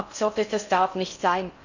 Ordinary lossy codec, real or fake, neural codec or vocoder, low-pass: none; fake; codec, 16 kHz in and 24 kHz out, 0.6 kbps, FocalCodec, streaming, 4096 codes; 7.2 kHz